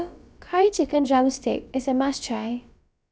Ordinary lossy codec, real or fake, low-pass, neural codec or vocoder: none; fake; none; codec, 16 kHz, about 1 kbps, DyCAST, with the encoder's durations